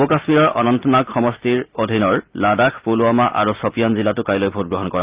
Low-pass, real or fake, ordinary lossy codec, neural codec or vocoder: 3.6 kHz; real; Opus, 32 kbps; none